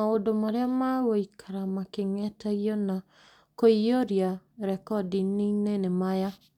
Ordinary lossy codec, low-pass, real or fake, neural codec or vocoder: none; 19.8 kHz; real; none